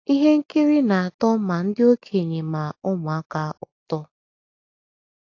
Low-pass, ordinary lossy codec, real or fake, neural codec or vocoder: 7.2 kHz; AAC, 48 kbps; real; none